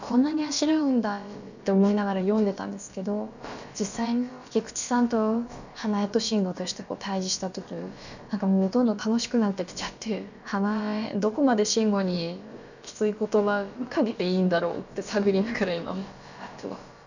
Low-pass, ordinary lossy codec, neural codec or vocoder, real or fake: 7.2 kHz; none; codec, 16 kHz, about 1 kbps, DyCAST, with the encoder's durations; fake